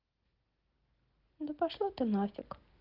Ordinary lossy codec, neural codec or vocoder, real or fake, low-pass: Opus, 16 kbps; none; real; 5.4 kHz